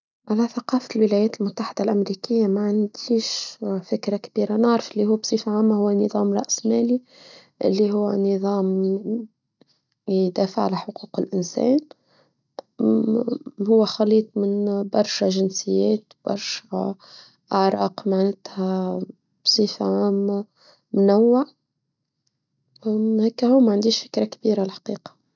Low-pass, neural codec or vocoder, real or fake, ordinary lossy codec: 7.2 kHz; none; real; none